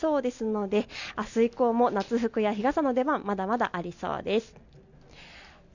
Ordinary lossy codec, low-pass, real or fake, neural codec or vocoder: none; 7.2 kHz; real; none